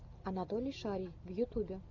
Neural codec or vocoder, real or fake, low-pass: none; real; 7.2 kHz